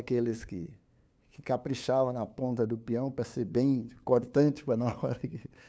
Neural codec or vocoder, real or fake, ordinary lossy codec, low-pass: codec, 16 kHz, 2 kbps, FunCodec, trained on LibriTTS, 25 frames a second; fake; none; none